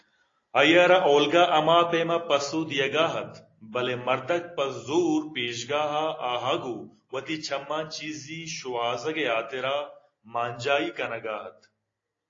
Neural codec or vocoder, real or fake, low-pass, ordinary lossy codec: none; real; 7.2 kHz; AAC, 32 kbps